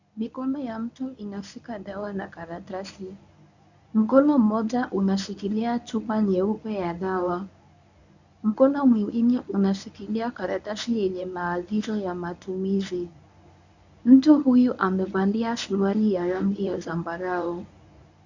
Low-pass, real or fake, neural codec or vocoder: 7.2 kHz; fake; codec, 24 kHz, 0.9 kbps, WavTokenizer, medium speech release version 1